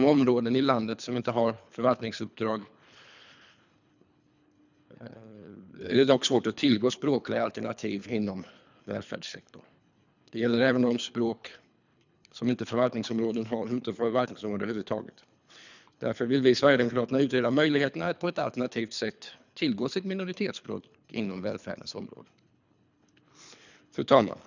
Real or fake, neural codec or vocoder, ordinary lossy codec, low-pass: fake; codec, 24 kHz, 3 kbps, HILCodec; none; 7.2 kHz